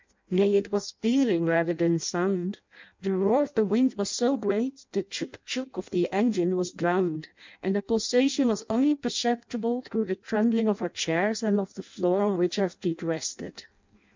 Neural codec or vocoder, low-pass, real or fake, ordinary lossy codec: codec, 16 kHz in and 24 kHz out, 0.6 kbps, FireRedTTS-2 codec; 7.2 kHz; fake; MP3, 64 kbps